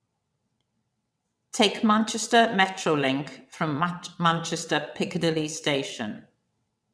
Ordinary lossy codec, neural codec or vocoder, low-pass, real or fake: none; vocoder, 22.05 kHz, 80 mel bands, WaveNeXt; none; fake